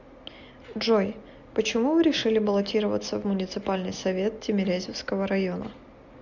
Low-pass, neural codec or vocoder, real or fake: 7.2 kHz; none; real